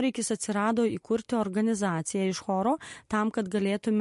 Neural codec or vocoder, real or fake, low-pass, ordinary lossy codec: none; real; 14.4 kHz; MP3, 48 kbps